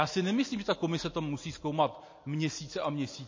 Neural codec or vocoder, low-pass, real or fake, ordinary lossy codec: none; 7.2 kHz; real; MP3, 32 kbps